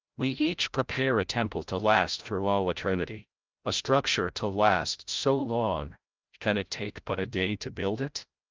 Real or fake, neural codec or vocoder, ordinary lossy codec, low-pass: fake; codec, 16 kHz, 0.5 kbps, FreqCodec, larger model; Opus, 24 kbps; 7.2 kHz